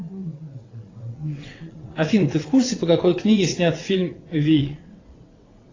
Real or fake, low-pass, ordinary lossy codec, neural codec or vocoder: fake; 7.2 kHz; AAC, 32 kbps; vocoder, 22.05 kHz, 80 mel bands, WaveNeXt